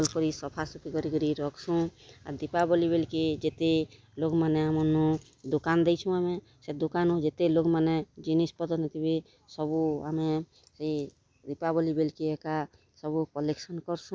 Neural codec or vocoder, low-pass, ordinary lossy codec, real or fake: none; none; none; real